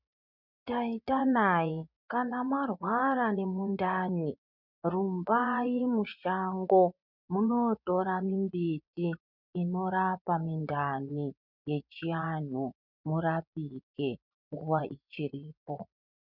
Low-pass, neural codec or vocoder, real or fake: 5.4 kHz; vocoder, 24 kHz, 100 mel bands, Vocos; fake